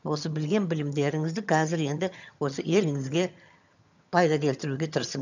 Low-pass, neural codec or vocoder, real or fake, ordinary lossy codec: 7.2 kHz; vocoder, 22.05 kHz, 80 mel bands, HiFi-GAN; fake; none